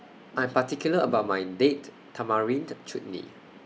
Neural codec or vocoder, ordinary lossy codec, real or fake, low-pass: none; none; real; none